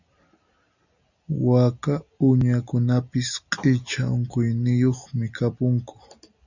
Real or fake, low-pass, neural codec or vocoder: real; 7.2 kHz; none